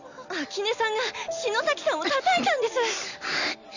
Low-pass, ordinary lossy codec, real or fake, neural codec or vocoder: 7.2 kHz; none; real; none